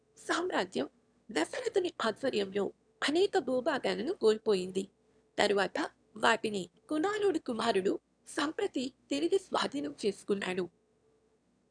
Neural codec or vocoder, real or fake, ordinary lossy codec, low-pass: autoencoder, 22.05 kHz, a latent of 192 numbers a frame, VITS, trained on one speaker; fake; none; 9.9 kHz